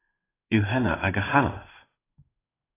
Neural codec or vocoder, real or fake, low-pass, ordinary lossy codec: codec, 16 kHz in and 24 kHz out, 1 kbps, XY-Tokenizer; fake; 3.6 kHz; AAC, 16 kbps